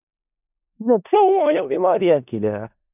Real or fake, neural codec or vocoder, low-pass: fake; codec, 16 kHz in and 24 kHz out, 0.4 kbps, LongCat-Audio-Codec, four codebook decoder; 3.6 kHz